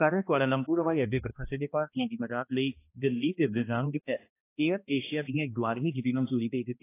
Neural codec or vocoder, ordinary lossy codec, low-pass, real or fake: codec, 16 kHz, 1 kbps, X-Codec, HuBERT features, trained on balanced general audio; AAC, 24 kbps; 3.6 kHz; fake